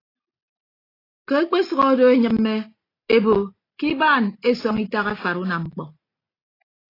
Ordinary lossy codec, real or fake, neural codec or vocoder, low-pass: AAC, 24 kbps; real; none; 5.4 kHz